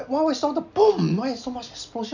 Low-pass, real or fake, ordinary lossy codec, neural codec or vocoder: 7.2 kHz; real; none; none